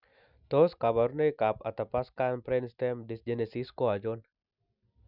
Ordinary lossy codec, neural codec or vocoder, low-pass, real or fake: none; none; 5.4 kHz; real